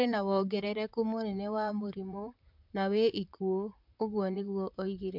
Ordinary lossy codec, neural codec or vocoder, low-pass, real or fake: MP3, 48 kbps; vocoder, 44.1 kHz, 128 mel bands, Pupu-Vocoder; 5.4 kHz; fake